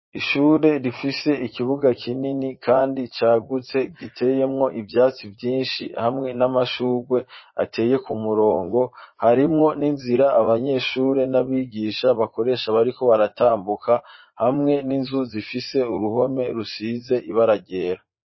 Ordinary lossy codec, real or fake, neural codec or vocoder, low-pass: MP3, 24 kbps; fake; vocoder, 44.1 kHz, 80 mel bands, Vocos; 7.2 kHz